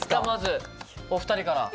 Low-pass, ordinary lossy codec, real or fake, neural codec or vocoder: none; none; real; none